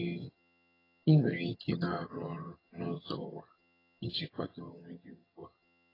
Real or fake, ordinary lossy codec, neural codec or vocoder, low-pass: fake; AAC, 24 kbps; vocoder, 22.05 kHz, 80 mel bands, HiFi-GAN; 5.4 kHz